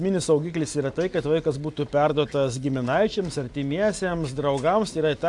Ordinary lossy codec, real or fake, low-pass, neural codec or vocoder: AAC, 64 kbps; real; 10.8 kHz; none